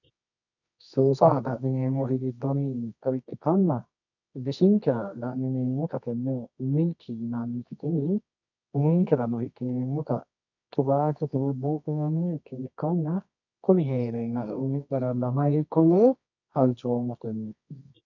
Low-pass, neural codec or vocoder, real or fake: 7.2 kHz; codec, 24 kHz, 0.9 kbps, WavTokenizer, medium music audio release; fake